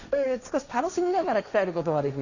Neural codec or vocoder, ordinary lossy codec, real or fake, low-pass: codec, 16 kHz, 1.1 kbps, Voila-Tokenizer; none; fake; 7.2 kHz